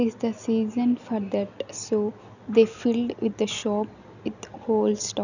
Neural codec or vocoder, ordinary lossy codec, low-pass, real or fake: none; none; 7.2 kHz; real